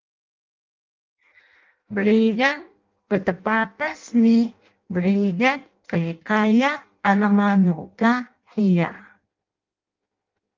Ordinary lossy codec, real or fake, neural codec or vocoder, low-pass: Opus, 32 kbps; fake; codec, 16 kHz in and 24 kHz out, 0.6 kbps, FireRedTTS-2 codec; 7.2 kHz